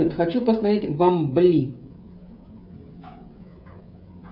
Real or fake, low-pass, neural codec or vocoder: fake; 5.4 kHz; codec, 16 kHz, 16 kbps, FreqCodec, smaller model